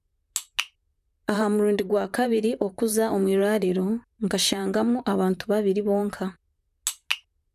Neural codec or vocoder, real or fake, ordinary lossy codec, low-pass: vocoder, 44.1 kHz, 128 mel bands, Pupu-Vocoder; fake; none; 14.4 kHz